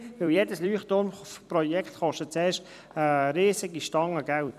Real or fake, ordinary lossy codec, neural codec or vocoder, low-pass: fake; none; vocoder, 44.1 kHz, 128 mel bands every 256 samples, BigVGAN v2; 14.4 kHz